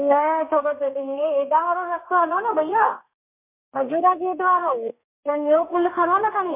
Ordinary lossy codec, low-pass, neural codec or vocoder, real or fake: MP3, 32 kbps; 3.6 kHz; codec, 32 kHz, 1.9 kbps, SNAC; fake